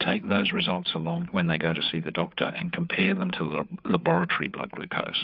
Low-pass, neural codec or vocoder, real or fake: 5.4 kHz; codec, 16 kHz, 4 kbps, FreqCodec, larger model; fake